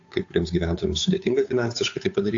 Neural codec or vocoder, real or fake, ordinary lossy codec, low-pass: codec, 16 kHz, 8 kbps, FunCodec, trained on Chinese and English, 25 frames a second; fake; AAC, 48 kbps; 7.2 kHz